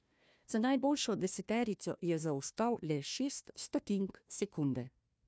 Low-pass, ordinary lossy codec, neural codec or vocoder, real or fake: none; none; codec, 16 kHz, 1 kbps, FunCodec, trained on Chinese and English, 50 frames a second; fake